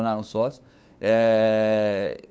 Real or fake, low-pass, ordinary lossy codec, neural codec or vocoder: fake; none; none; codec, 16 kHz, 2 kbps, FunCodec, trained on LibriTTS, 25 frames a second